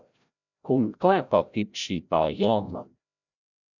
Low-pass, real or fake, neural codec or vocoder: 7.2 kHz; fake; codec, 16 kHz, 0.5 kbps, FreqCodec, larger model